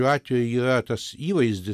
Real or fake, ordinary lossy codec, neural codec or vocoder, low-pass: real; MP3, 96 kbps; none; 14.4 kHz